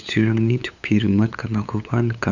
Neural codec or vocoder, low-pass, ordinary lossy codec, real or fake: codec, 16 kHz, 8 kbps, FunCodec, trained on LibriTTS, 25 frames a second; 7.2 kHz; none; fake